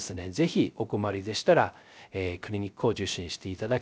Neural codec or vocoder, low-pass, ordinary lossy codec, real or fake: codec, 16 kHz, 0.3 kbps, FocalCodec; none; none; fake